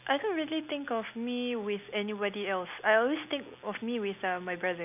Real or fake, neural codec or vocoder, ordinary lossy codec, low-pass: real; none; none; 3.6 kHz